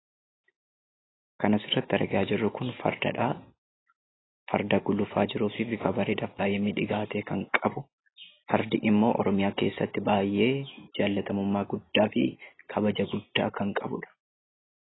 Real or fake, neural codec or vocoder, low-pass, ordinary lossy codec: real; none; 7.2 kHz; AAC, 16 kbps